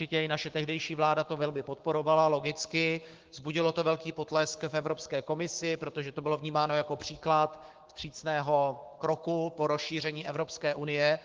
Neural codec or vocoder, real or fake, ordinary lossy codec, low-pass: codec, 16 kHz, 6 kbps, DAC; fake; Opus, 16 kbps; 7.2 kHz